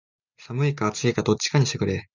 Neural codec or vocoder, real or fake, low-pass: none; real; 7.2 kHz